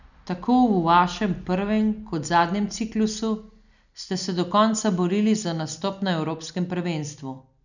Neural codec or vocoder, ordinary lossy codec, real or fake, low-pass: none; none; real; 7.2 kHz